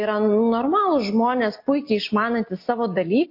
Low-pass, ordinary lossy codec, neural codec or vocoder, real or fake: 5.4 kHz; MP3, 32 kbps; none; real